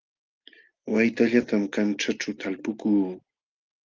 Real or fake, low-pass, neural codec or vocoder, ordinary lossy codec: real; 7.2 kHz; none; Opus, 32 kbps